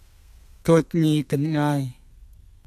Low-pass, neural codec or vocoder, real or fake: 14.4 kHz; codec, 32 kHz, 1.9 kbps, SNAC; fake